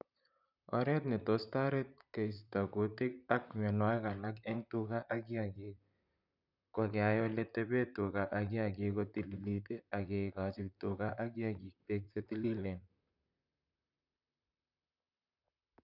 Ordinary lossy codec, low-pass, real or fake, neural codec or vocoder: none; 5.4 kHz; fake; vocoder, 44.1 kHz, 128 mel bands, Pupu-Vocoder